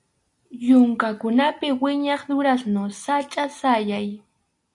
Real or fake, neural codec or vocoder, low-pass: real; none; 10.8 kHz